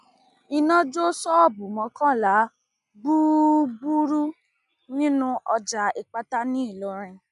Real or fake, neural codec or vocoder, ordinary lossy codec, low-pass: real; none; none; 10.8 kHz